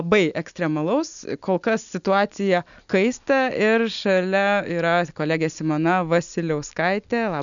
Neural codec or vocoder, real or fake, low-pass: none; real; 7.2 kHz